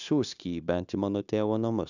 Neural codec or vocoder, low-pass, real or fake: codec, 16 kHz, 0.9 kbps, LongCat-Audio-Codec; 7.2 kHz; fake